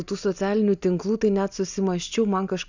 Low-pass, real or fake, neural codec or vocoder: 7.2 kHz; real; none